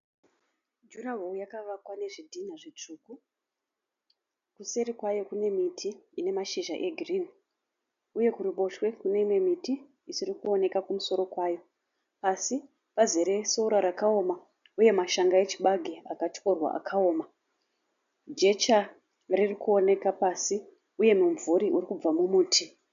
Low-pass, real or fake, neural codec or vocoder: 7.2 kHz; real; none